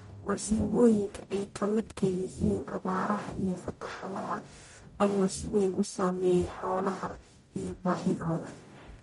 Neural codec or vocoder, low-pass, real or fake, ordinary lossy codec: codec, 44.1 kHz, 0.9 kbps, DAC; 19.8 kHz; fake; MP3, 48 kbps